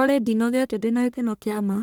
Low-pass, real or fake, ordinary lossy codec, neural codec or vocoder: none; fake; none; codec, 44.1 kHz, 1.7 kbps, Pupu-Codec